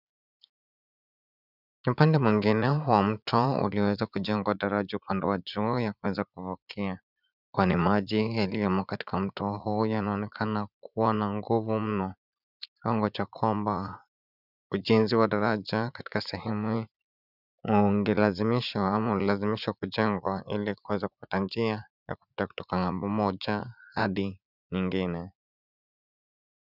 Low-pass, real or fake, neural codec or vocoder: 5.4 kHz; fake; vocoder, 44.1 kHz, 80 mel bands, Vocos